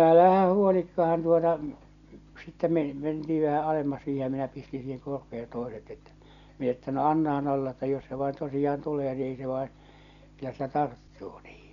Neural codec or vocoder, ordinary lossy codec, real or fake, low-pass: none; none; real; 7.2 kHz